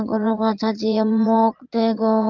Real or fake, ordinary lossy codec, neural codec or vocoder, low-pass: fake; Opus, 24 kbps; vocoder, 22.05 kHz, 80 mel bands, Vocos; 7.2 kHz